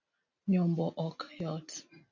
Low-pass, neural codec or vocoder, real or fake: 7.2 kHz; none; real